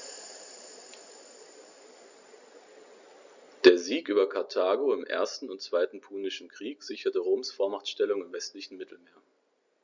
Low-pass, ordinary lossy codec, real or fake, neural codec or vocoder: 7.2 kHz; Opus, 64 kbps; real; none